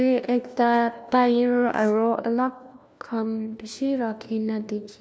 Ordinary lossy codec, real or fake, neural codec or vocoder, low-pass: none; fake; codec, 16 kHz, 1 kbps, FunCodec, trained on LibriTTS, 50 frames a second; none